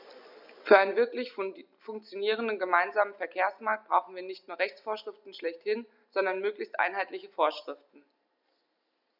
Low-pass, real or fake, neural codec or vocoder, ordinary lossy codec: 5.4 kHz; real; none; none